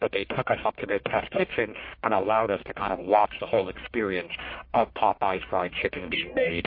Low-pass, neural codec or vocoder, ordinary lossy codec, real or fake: 5.4 kHz; codec, 44.1 kHz, 1.7 kbps, Pupu-Codec; MP3, 32 kbps; fake